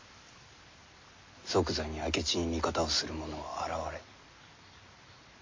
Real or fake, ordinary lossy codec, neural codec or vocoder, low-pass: real; MP3, 48 kbps; none; 7.2 kHz